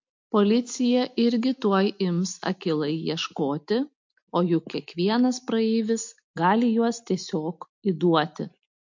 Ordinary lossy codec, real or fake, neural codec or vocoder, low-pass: MP3, 48 kbps; real; none; 7.2 kHz